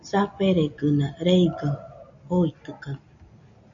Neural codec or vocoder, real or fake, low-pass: none; real; 7.2 kHz